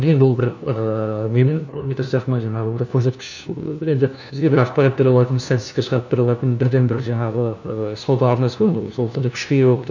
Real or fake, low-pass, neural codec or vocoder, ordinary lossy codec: fake; 7.2 kHz; codec, 16 kHz, 1 kbps, FunCodec, trained on LibriTTS, 50 frames a second; none